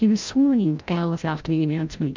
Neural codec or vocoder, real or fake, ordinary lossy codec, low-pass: codec, 16 kHz, 0.5 kbps, FreqCodec, larger model; fake; MP3, 64 kbps; 7.2 kHz